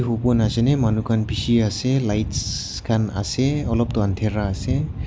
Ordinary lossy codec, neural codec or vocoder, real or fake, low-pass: none; none; real; none